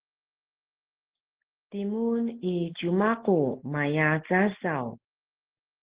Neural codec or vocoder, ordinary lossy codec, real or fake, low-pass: none; Opus, 16 kbps; real; 3.6 kHz